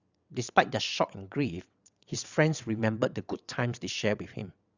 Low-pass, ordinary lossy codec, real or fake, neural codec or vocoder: 7.2 kHz; Opus, 64 kbps; fake; vocoder, 22.05 kHz, 80 mel bands, WaveNeXt